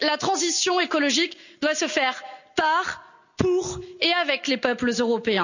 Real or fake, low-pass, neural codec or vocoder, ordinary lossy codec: real; 7.2 kHz; none; none